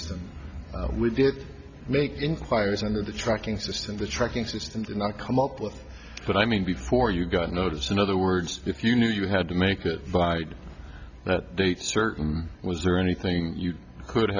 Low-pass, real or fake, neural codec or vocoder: 7.2 kHz; real; none